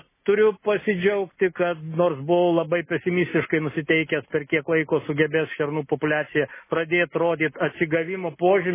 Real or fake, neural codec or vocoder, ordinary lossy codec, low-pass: real; none; MP3, 16 kbps; 3.6 kHz